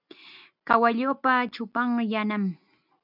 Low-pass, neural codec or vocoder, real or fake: 5.4 kHz; none; real